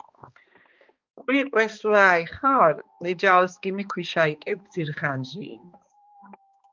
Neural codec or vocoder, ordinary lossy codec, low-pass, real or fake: codec, 16 kHz, 2 kbps, X-Codec, HuBERT features, trained on balanced general audio; Opus, 24 kbps; 7.2 kHz; fake